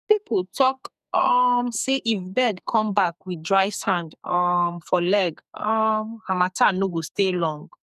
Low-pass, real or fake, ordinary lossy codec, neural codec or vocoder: 14.4 kHz; fake; none; codec, 44.1 kHz, 2.6 kbps, SNAC